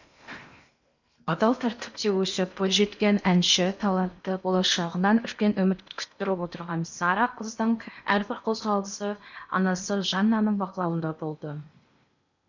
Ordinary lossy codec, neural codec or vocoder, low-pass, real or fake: none; codec, 16 kHz in and 24 kHz out, 0.8 kbps, FocalCodec, streaming, 65536 codes; 7.2 kHz; fake